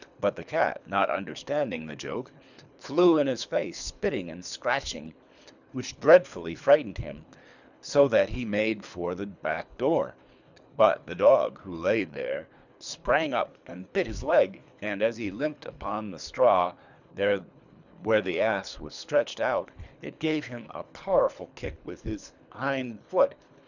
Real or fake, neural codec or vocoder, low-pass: fake; codec, 24 kHz, 3 kbps, HILCodec; 7.2 kHz